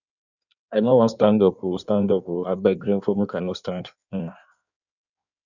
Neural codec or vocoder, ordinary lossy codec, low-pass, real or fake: codec, 16 kHz in and 24 kHz out, 1.1 kbps, FireRedTTS-2 codec; none; 7.2 kHz; fake